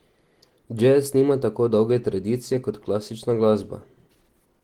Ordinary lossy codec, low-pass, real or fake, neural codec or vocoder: Opus, 16 kbps; 19.8 kHz; real; none